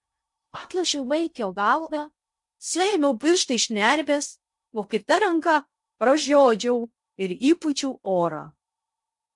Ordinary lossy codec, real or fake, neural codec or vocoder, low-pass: MP3, 64 kbps; fake; codec, 16 kHz in and 24 kHz out, 0.6 kbps, FocalCodec, streaming, 2048 codes; 10.8 kHz